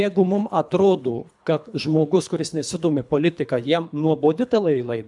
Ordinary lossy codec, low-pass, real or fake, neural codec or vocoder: MP3, 96 kbps; 10.8 kHz; fake; codec, 24 kHz, 3 kbps, HILCodec